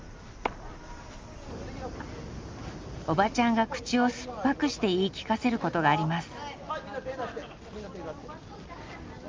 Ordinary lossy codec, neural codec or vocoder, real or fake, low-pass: Opus, 32 kbps; none; real; 7.2 kHz